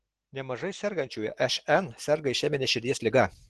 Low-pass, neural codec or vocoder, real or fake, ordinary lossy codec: 10.8 kHz; none; real; Opus, 16 kbps